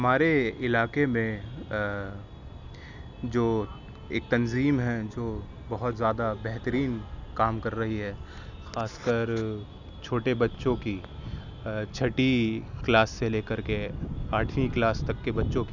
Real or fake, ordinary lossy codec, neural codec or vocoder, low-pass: real; none; none; 7.2 kHz